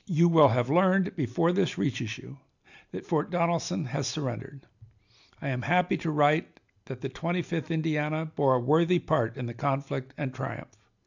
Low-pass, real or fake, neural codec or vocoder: 7.2 kHz; real; none